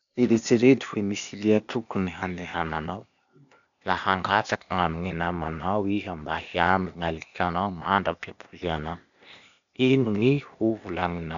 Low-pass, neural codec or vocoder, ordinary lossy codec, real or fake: 7.2 kHz; codec, 16 kHz, 0.8 kbps, ZipCodec; none; fake